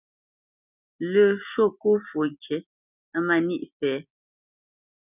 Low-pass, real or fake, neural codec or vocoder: 3.6 kHz; real; none